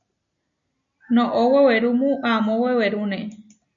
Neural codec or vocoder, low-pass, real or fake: none; 7.2 kHz; real